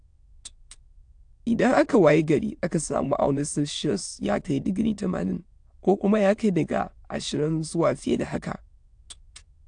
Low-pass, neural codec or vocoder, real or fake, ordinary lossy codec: 9.9 kHz; autoencoder, 22.05 kHz, a latent of 192 numbers a frame, VITS, trained on many speakers; fake; AAC, 64 kbps